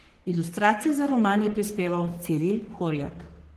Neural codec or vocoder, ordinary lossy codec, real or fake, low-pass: codec, 44.1 kHz, 3.4 kbps, Pupu-Codec; Opus, 16 kbps; fake; 14.4 kHz